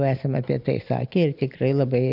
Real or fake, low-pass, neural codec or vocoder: real; 5.4 kHz; none